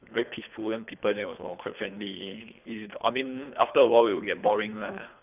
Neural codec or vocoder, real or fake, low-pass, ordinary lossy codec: codec, 24 kHz, 3 kbps, HILCodec; fake; 3.6 kHz; none